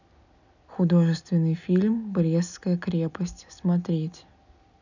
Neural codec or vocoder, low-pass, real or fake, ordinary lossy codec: none; 7.2 kHz; real; none